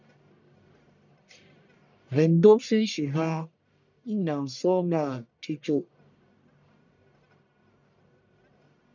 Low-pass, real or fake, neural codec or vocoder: 7.2 kHz; fake; codec, 44.1 kHz, 1.7 kbps, Pupu-Codec